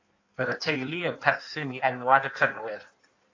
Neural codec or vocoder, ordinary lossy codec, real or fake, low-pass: codec, 16 kHz in and 24 kHz out, 1.1 kbps, FireRedTTS-2 codec; AAC, 48 kbps; fake; 7.2 kHz